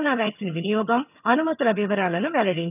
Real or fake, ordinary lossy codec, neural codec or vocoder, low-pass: fake; none; vocoder, 22.05 kHz, 80 mel bands, HiFi-GAN; 3.6 kHz